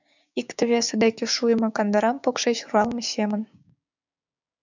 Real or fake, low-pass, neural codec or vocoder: fake; 7.2 kHz; codec, 16 kHz, 6 kbps, DAC